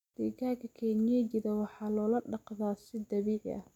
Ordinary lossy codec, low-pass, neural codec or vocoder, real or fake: none; 19.8 kHz; none; real